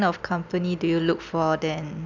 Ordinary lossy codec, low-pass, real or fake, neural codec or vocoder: none; 7.2 kHz; real; none